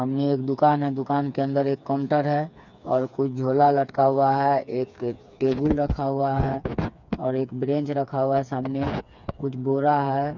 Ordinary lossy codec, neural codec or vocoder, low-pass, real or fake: none; codec, 16 kHz, 4 kbps, FreqCodec, smaller model; 7.2 kHz; fake